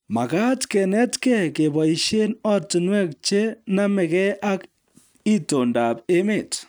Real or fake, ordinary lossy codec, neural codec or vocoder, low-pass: real; none; none; none